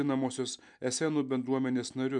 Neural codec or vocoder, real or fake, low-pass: none; real; 10.8 kHz